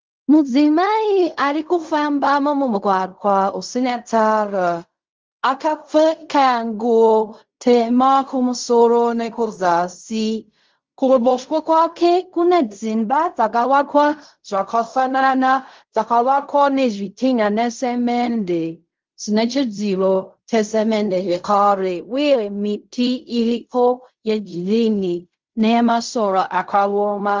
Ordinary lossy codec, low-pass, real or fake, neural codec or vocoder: Opus, 32 kbps; 7.2 kHz; fake; codec, 16 kHz in and 24 kHz out, 0.4 kbps, LongCat-Audio-Codec, fine tuned four codebook decoder